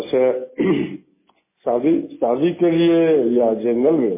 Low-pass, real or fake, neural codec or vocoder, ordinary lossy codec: 3.6 kHz; real; none; MP3, 16 kbps